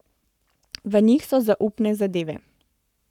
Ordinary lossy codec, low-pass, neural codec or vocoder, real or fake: none; 19.8 kHz; codec, 44.1 kHz, 7.8 kbps, Pupu-Codec; fake